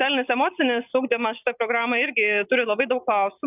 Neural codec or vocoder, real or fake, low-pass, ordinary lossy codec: none; real; 3.6 kHz; AAC, 32 kbps